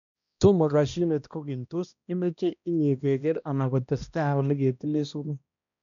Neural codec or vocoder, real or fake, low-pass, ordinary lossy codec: codec, 16 kHz, 1 kbps, X-Codec, HuBERT features, trained on balanced general audio; fake; 7.2 kHz; MP3, 96 kbps